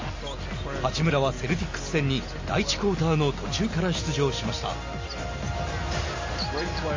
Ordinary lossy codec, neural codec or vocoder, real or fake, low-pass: MP3, 32 kbps; none; real; 7.2 kHz